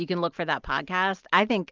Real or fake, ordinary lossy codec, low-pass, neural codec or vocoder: real; Opus, 24 kbps; 7.2 kHz; none